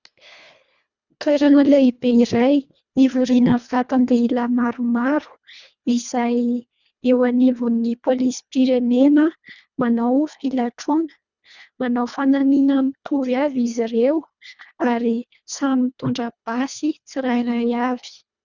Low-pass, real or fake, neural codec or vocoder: 7.2 kHz; fake; codec, 24 kHz, 1.5 kbps, HILCodec